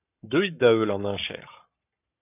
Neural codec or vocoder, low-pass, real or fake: codec, 44.1 kHz, 7.8 kbps, Pupu-Codec; 3.6 kHz; fake